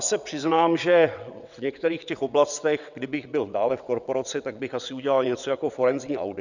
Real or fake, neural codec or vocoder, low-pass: fake; vocoder, 22.05 kHz, 80 mel bands, WaveNeXt; 7.2 kHz